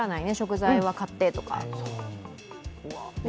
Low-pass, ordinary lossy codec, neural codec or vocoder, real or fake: none; none; none; real